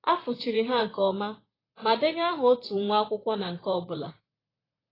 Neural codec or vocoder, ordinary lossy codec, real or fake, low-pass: vocoder, 44.1 kHz, 128 mel bands, Pupu-Vocoder; AAC, 24 kbps; fake; 5.4 kHz